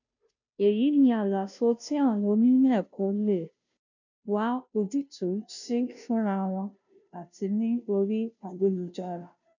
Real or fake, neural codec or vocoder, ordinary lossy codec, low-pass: fake; codec, 16 kHz, 0.5 kbps, FunCodec, trained on Chinese and English, 25 frames a second; none; 7.2 kHz